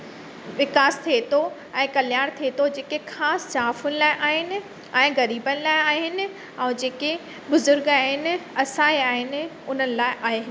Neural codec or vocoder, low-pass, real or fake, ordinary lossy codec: none; none; real; none